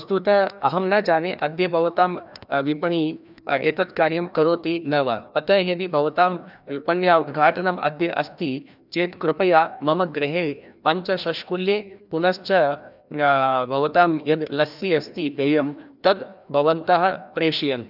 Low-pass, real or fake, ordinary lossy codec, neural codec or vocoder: 5.4 kHz; fake; none; codec, 16 kHz, 1 kbps, FreqCodec, larger model